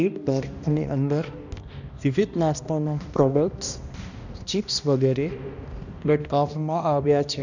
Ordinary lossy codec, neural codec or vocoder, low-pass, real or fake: none; codec, 16 kHz, 1 kbps, X-Codec, HuBERT features, trained on balanced general audio; 7.2 kHz; fake